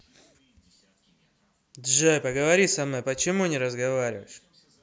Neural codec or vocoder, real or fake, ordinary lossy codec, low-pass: none; real; none; none